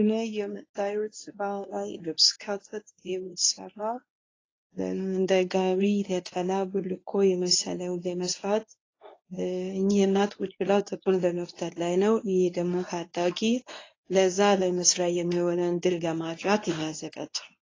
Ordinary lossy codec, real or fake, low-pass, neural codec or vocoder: AAC, 32 kbps; fake; 7.2 kHz; codec, 24 kHz, 0.9 kbps, WavTokenizer, medium speech release version 1